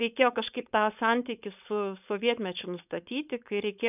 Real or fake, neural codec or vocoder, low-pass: fake; codec, 16 kHz, 4.8 kbps, FACodec; 3.6 kHz